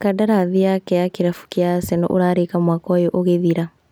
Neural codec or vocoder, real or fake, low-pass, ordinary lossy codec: none; real; none; none